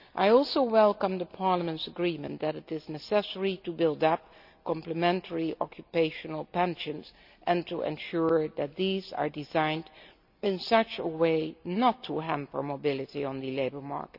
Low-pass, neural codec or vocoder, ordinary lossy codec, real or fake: 5.4 kHz; none; none; real